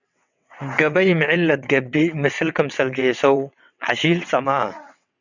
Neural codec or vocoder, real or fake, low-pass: vocoder, 22.05 kHz, 80 mel bands, WaveNeXt; fake; 7.2 kHz